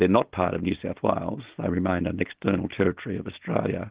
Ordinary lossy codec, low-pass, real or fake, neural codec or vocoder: Opus, 16 kbps; 3.6 kHz; real; none